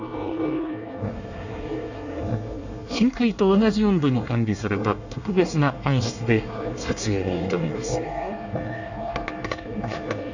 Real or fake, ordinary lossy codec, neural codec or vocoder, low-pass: fake; none; codec, 24 kHz, 1 kbps, SNAC; 7.2 kHz